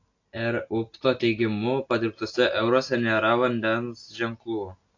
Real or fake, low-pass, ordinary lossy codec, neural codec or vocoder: real; 7.2 kHz; AAC, 48 kbps; none